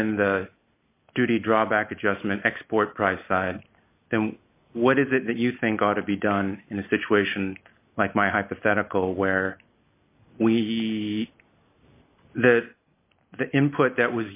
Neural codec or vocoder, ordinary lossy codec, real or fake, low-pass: none; AAC, 32 kbps; real; 3.6 kHz